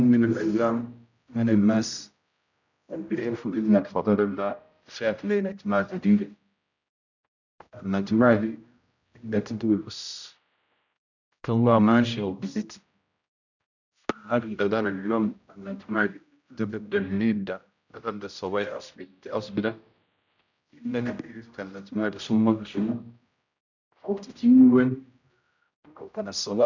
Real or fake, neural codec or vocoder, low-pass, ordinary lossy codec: fake; codec, 16 kHz, 0.5 kbps, X-Codec, HuBERT features, trained on general audio; 7.2 kHz; none